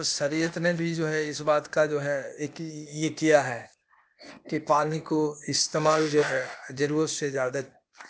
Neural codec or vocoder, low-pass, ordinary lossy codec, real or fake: codec, 16 kHz, 0.8 kbps, ZipCodec; none; none; fake